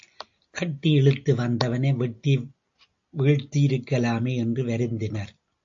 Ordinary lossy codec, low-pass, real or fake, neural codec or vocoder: AAC, 64 kbps; 7.2 kHz; real; none